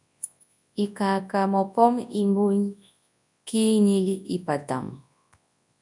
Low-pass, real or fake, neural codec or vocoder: 10.8 kHz; fake; codec, 24 kHz, 0.9 kbps, WavTokenizer, large speech release